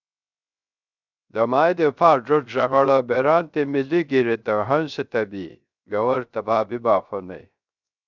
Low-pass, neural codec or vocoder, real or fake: 7.2 kHz; codec, 16 kHz, 0.3 kbps, FocalCodec; fake